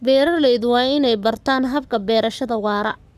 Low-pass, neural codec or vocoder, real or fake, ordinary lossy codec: 14.4 kHz; codec, 44.1 kHz, 7.8 kbps, Pupu-Codec; fake; none